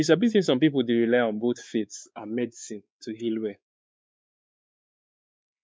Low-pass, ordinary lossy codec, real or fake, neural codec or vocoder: none; none; fake; codec, 16 kHz, 4 kbps, X-Codec, WavLM features, trained on Multilingual LibriSpeech